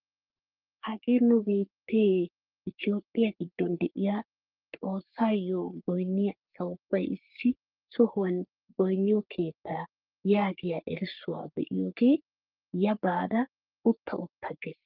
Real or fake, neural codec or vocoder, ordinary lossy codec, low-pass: fake; codec, 44.1 kHz, 3.4 kbps, Pupu-Codec; Opus, 32 kbps; 5.4 kHz